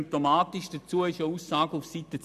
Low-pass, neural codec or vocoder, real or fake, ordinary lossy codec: 14.4 kHz; none; real; none